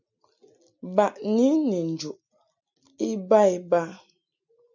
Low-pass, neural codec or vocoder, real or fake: 7.2 kHz; none; real